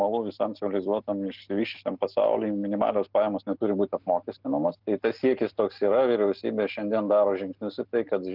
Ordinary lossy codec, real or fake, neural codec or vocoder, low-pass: Opus, 32 kbps; real; none; 5.4 kHz